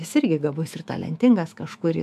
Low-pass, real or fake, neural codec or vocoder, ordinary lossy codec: 14.4 kHz; fake; autoencoder, 48 kHz, 128 numbers a frame, DAC-VAE, trained on Japanese speech; AAC, 96 kbps